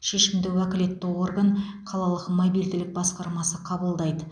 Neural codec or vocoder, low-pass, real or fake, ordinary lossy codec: none; 9.9 kHz; real; none